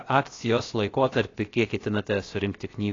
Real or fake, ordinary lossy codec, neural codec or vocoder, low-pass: fake; AAC, 32 kbps; codec, 16 kHz, 0.7 kbps, FocalCodec; 7.2 kHz